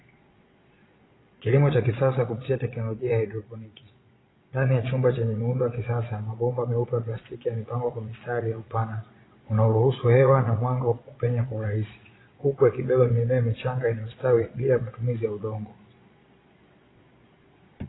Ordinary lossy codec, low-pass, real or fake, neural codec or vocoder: AAC, 16 kbps; 7.2 kHz; fake; vocoder, 22.05 kHz, 80 mel bands, Vocos